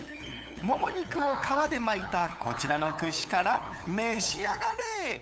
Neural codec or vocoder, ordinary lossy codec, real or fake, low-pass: codec, 16 kHz, 8 kbps, FunCodec, trained on LibriTTS, 25 frames a second; none; fake; none